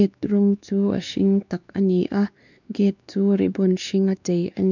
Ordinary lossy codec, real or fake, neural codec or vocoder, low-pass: none; fake; codec, 16 kHz, 2 kbps, X-Codec, WavLM features, trained on Multilingual LibriSpeech; 7.2 kHz